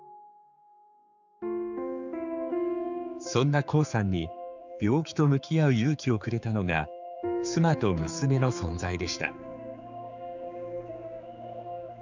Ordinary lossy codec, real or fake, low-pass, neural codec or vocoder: none; fake; 7.2 kHz; codec, 16 kHz, 4 kbps, X-Codec, HuBERT features, trained on general audio